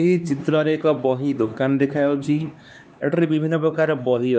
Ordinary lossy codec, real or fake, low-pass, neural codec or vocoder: none; fake; none; codec, 16 kHz, 2 kbps, X-Codec, HuBERT features, trained on LibriSpeech